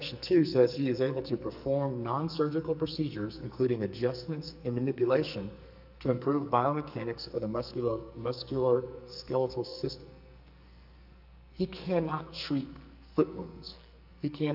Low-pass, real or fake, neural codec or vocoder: 5.4 kHz; fake; codec, 44.1 kHz, 2.6 kbps, SNAC